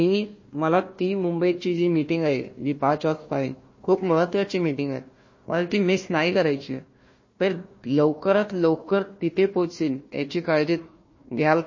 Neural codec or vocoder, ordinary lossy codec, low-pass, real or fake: codec, 16 kHz, 1 kbps, FunCodec, trained on Chinese and English, 50 frames a second; MP3, 32 kbps; 7.2 kHz; fake